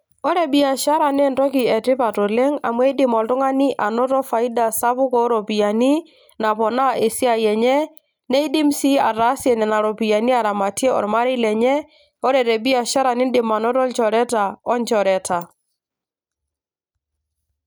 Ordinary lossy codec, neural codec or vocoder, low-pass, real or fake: none; none; none; real